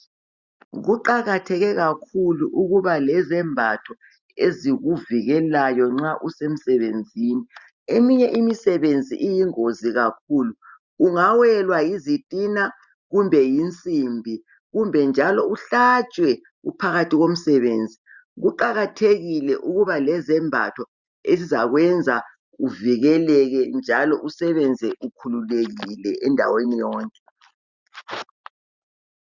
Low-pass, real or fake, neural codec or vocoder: 7.2 kHz; real; none